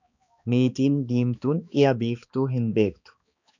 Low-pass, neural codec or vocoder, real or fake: 7.2 kHz; codec, 16 kHz, 2 kbps, X-Codec, HuBERT features, trained on balanced general audio; fake